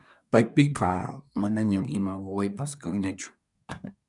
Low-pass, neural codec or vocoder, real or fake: 10.8 kHz; codec, 24 kHz, 1 kbps, SNAC; fake